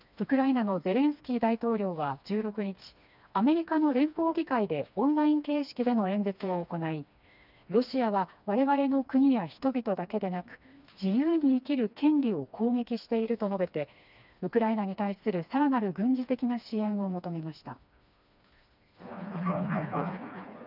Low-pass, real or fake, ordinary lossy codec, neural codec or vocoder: 5.4 kHz; fake; none; codec, 16 kHz, 2 kbps, FreqCodec, smaller model